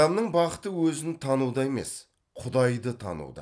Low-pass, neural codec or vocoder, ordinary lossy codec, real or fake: none; none; none; real